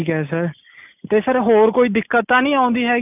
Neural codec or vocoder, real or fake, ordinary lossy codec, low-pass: none; real; none; 3.6 kHz